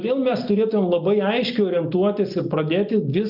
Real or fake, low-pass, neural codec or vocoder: real; 5.4 kHz; none